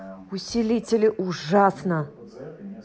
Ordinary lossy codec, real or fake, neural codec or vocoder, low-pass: none; real; none; none